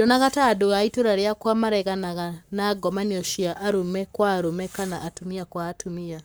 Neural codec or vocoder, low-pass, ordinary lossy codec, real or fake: codec, 44.1 kHz, 7.8 kbps, Pupu-Codec; none; none; fake